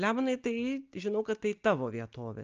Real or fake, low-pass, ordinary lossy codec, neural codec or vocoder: real; 7.2 kHz; Opus, 24 kbps; none